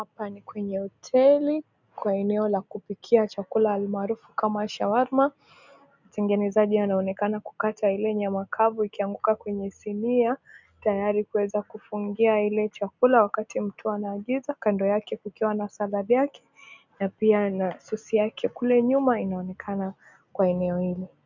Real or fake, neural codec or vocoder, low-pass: real; none; 7.2 kHz